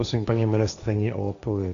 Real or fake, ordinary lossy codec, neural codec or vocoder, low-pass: fake; Opus, 64 kbps; codec, 16 kHz, 1.1 kbps, Voila-Tokenizer; 7.2 kHz